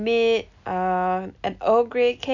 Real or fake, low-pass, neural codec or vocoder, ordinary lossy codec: real; 7.2 kHz; none; none